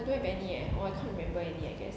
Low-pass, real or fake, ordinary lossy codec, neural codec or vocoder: none; real; none; none